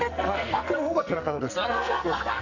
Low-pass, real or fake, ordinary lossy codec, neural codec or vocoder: 7.2 kHz; fake; none; codec, 44.1 kHz, 2.6 kbps, SNAC